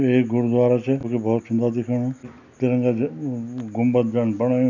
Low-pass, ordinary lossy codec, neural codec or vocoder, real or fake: 7.2 kHz; none; none; real